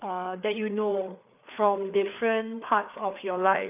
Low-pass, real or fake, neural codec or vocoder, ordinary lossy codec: 3.6 kHz; fake; codec, 16 kHz, 4 kbps, FreqCodec, larger model; none